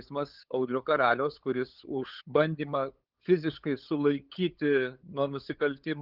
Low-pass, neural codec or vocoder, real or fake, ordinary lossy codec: 5.4 kHz; codec, 16 kHz, 8 kbps, FunCodec, trained on LibriTTS, 25 frames a second; fake; Opus, 32 kbps